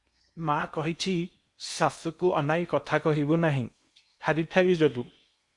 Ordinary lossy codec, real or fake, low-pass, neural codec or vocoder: Opus, 64 kbps; fake; 10.8 kHz; codec, 16 kHz in and 24 kHz out, 0.8 kbps, FocalCodec, streaming, 65536 codes